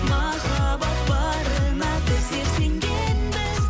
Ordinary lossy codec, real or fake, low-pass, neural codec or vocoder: none; real; none; none